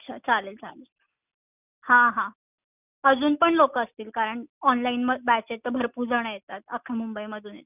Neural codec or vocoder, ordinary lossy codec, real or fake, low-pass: none; none; real; 3.6 kHz